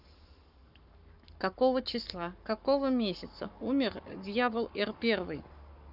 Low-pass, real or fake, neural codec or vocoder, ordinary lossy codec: 5.4 kHz; fake; codec, 44.1 kHz, 7.8 kbps, Pupu-Codec; none